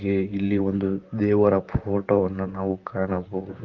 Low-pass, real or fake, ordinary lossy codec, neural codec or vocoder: 7.2 kHz; fake; Opus, 32 kbps; vocoder, 44.1 kHz, 128 mel bands, Pupu-Vocoder